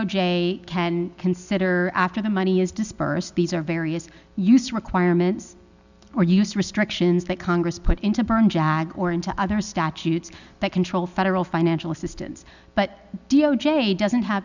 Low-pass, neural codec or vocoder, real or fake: 7.2 kHz; none; real